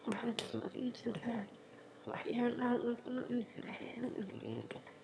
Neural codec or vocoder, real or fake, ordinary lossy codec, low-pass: autoencoder, 22.05 kHz, a latent of 192 numbers a frame, VITS, trained on one speaker; fake; none; none